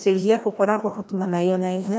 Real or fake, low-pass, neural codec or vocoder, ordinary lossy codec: fake; none; codec, 16 kHz, 1 kbps, FreqCodec, larger model; none